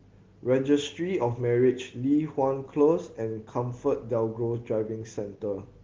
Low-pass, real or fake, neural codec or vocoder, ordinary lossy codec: 7.2 kHz; real; none; Opus, 16 kbps